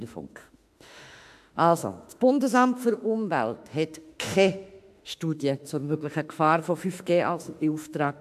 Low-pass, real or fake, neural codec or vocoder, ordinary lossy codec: 14.4 kHz; fake; autoencoder, 48 kHz, 32 numbers a frame, DAC-VAE, trained on Japanese speech; AAC, 96 kbps